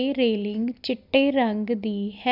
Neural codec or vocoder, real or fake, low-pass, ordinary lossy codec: none; real; 5.4 kHz; none